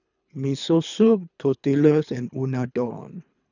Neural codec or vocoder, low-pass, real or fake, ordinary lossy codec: codec, 24 kHz, 3 kbps, HILCodec; 7.2 kHz; fake; none